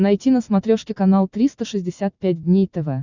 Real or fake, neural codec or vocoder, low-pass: real; none; 7.2 kHz